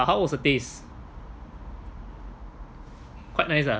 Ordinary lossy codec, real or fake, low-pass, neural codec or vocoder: none; real; none; none